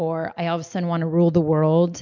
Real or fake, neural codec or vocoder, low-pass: real; none; 7.2 kHz